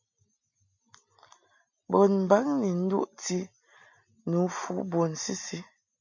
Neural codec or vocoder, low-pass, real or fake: none; 7.2 kHz; real